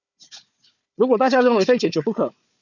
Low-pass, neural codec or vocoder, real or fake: 7.2 kHz; codec, 16 kHz, 4 kbps, FunCodec, trained on Chinese and English, 50 frames a second; fake